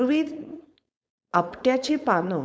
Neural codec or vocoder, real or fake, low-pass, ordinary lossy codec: codec, 16 kHz, 4.8 kbps, FACodec; fake; none; none